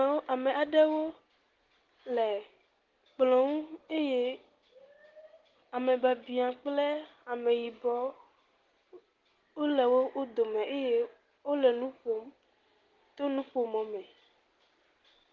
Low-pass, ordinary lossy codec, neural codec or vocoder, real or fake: 7.2 kHz; Opus, 16 kbps; none; real